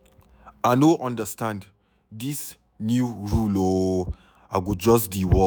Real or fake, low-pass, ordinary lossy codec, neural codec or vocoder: fake; none; none; autoencoder, 48 kHz, 128 numbers a frame, DAC-VAE, trained on Japanese speech